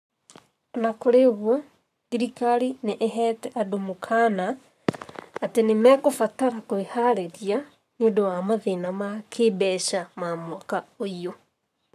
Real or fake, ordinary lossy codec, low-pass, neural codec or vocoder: fake; none; 14.4 kHz; codec, 44.1 kHz, 7.8 kbps, Pupu-Codec